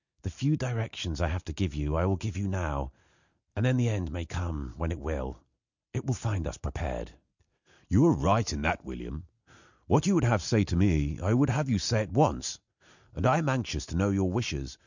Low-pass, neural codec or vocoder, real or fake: 7.2 kHz; none; real